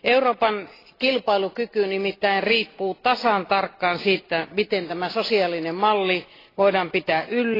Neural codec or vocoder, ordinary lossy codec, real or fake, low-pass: none; AAC, 24 kbps; real; 5.4 kHz